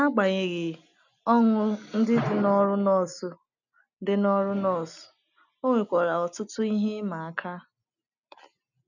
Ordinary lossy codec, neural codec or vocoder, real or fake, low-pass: none; none; real; 7.2 kHz